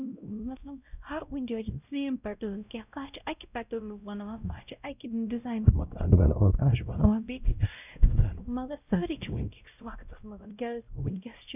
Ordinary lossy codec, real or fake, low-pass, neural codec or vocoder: none; fake; 3.6 kHz; codec, 16 kHz, 0.5 kbps, X-Codec, WavLM features, trained on Multilingual LibriSpeech